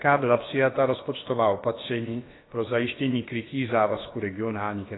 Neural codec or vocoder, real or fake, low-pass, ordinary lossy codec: codec, 16 kHz, about 1 kbps, DyCAST, with the encoder's durations; fake; 7.2 kHz; AAC, 16 kbps